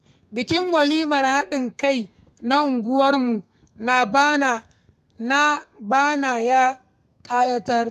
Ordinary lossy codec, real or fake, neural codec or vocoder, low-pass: none; fake; codec, 32 kHz, 1.9 kbps, SNAC; 14.4 kHz